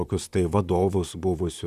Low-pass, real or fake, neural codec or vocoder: 14.4 kHz; fake; vocoder, 44.1 kHz, 128 mel bands, Pupu-Vocoder